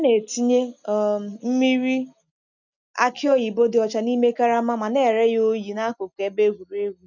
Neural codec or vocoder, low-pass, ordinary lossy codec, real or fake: none; 7.2 kHz; none; real